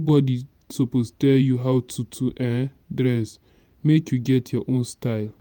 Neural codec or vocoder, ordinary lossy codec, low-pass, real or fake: vocoder, 48 kHz, 128 mel bands, Vocos; none; 19.8 kHz; fake